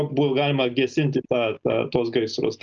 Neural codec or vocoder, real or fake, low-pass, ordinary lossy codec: none; real; 7.2 kHz; Opus, 24 kbps